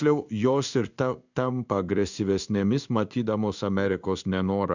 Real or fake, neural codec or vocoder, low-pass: fake; codec, 16 kHz in and 24 kHz out, 1 kbps, XY-Tokenizer; 7.2 kHz